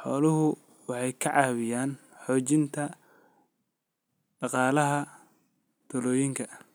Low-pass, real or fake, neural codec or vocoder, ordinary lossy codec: none; real; none; none